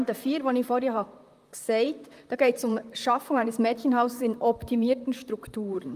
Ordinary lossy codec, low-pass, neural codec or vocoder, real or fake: Opus, 32 kbps; 14.4 kHz; vocoder, 44.1 kHz, 128 mel bands, Pupu-Vocoder; fake